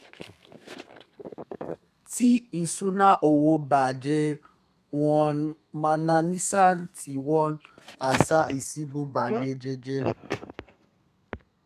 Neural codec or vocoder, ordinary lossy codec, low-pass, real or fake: codec, 32 kHz, 1.9 kbps, SNAC; none; 14.4 kHz; fake